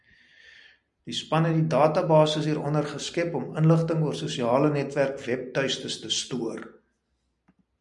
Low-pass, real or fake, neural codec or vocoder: 10.8 kHz; real; none